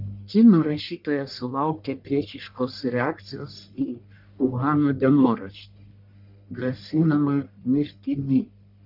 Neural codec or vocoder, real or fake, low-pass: codec, 44.1 kHz, 1.7 kbps, Pupu-Codec; fake; 5.4 kHz